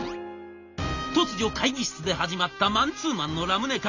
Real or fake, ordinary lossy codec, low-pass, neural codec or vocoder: real; Opus, 64 kbps; 7.2 kHz; none